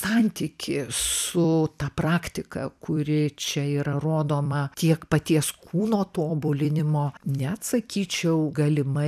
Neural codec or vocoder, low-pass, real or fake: vocoder, 44.1 kHz, 128 mel bands every 256 samples, BigVGAN v2; 14.4 kHz; fake